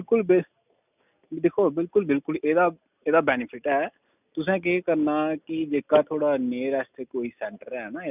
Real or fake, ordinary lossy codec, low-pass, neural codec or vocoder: real; none; 3.6 kHz; none